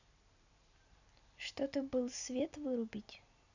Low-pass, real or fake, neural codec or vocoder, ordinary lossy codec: 7.2 kHz; real; none; none